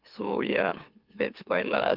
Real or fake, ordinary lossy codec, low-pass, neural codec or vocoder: fake; Opus, 24 kbps; 5.4 kHz; autoencoder, 44.1 kHz, a latent of 192 numbers a frame, MeloTTS